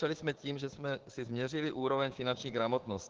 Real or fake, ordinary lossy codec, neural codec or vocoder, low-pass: fake; Opus, 16 kbps; codec, 16 kHz, 6 kbps, DAC; 7.2 kHz